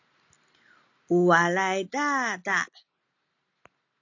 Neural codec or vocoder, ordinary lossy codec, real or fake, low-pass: none; AAC, 48 kbps; real; 7.2 kHz